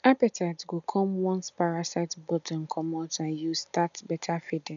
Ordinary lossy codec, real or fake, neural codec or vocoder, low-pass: none; real; none; 7.2 kHz